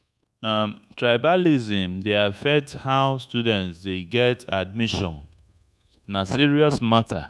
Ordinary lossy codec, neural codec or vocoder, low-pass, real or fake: none; codec, 24 kHz, 1.2 kbps, DualCodec; none; fake